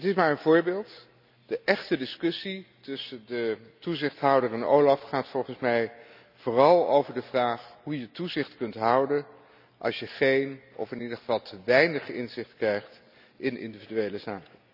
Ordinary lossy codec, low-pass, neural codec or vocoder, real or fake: none; 5.4 kHz; none; real